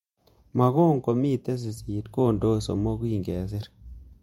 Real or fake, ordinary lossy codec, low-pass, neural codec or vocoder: fake; MP3, 64 kbps; 19.8 kHz; vocoder, 48 kHz, 128 mel bands, Vocos